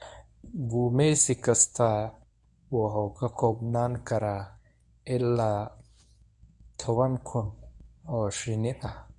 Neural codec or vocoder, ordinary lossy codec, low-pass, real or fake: codec, 24 kHz, 0.9 kbps, WavTokenizer, medium speech release version 1; none; 10.8 kHz; fake